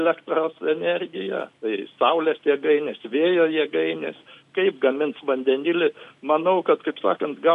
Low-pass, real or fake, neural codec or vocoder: 10.8 kHz; real; none